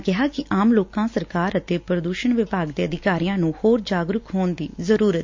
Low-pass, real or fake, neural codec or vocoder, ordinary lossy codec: 7.2 kHz; real; none; MP3, 48 kbps